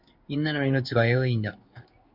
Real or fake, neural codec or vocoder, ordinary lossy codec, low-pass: fake; codec, 16 kHz in and 24 kHz out, 1 kbps, XY-Tokenizer; MP3, 48 kbps; 5.4 kHz